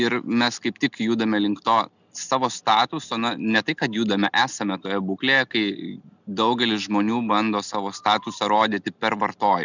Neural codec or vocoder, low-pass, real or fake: none; 7.2 kHz; real